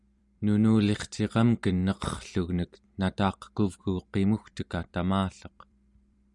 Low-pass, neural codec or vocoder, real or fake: 10.8 kHz; vocoder, 44.1 kHz, 128 mel bands every 256 samples, BigVGAN v2; fake